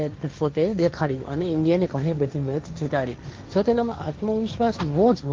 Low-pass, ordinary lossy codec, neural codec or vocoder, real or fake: 7.2 kHz; Opus, 32 kbps; codec, 16 kHz, 1.1 kbps, Voila-Tokenizer; fake